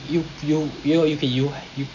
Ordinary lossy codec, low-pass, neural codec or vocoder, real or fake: none; 7.2 kHz; none; real